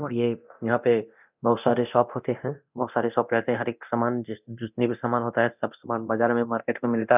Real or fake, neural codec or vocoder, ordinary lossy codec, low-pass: fake; codec, 24 kHz, 0.9 kbps, DualCodec; none; 3.6 kHz